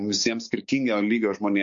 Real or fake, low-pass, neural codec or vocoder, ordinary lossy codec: fake; 7.2 kHz; codec, 16 kHz, 6 kbps, DAC; MP3, 48 kbps